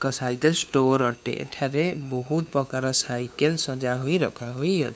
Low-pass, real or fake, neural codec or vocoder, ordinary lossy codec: none; fake; codec, 16 kHz, 2 kbps, FunCodec, trained on LibriTTS, 25 frames a second; none